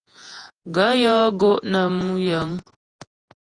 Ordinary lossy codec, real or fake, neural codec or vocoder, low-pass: Opus, 32 kbps; fake; vocoder, 48 kHz, 128 mel bands, Vocos; 9.9 kHz